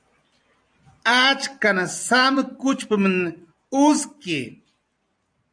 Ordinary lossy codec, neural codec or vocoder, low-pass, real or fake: AAC, 64 kbps; vocoder, 44.1 kHz, 128 mel bands every 256 samples, BigVGAN v2; 9.9 kHz; fake